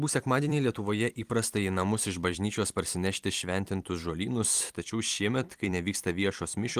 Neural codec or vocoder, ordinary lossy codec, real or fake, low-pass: vocoder, 44.1 kHz, 128 mel bands every 256 samples, BigVGAN v2; Opus, 24 kbps; fake; 14.4 kHz